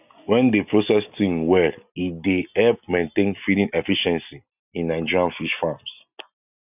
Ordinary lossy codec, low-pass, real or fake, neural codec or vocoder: none; 3.6 kHz; real; none